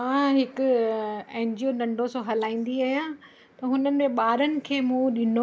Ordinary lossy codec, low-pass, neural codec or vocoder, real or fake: none; none; none; real